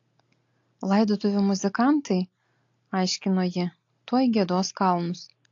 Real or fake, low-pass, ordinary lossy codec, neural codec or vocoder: real; 7.2 kHz; AAC, 48 kbps; none